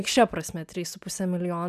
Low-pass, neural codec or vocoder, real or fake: 14.4 kHz; none; real